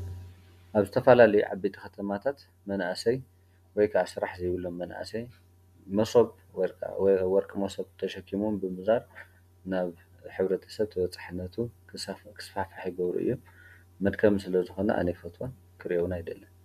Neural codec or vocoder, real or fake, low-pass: none; real; 14.4 kHz